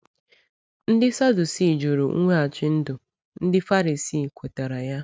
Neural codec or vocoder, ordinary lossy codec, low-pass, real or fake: none; none; none; real